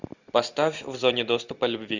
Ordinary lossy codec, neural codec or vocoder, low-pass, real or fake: Opus, 64 kbps; none; 7.2 kHz; real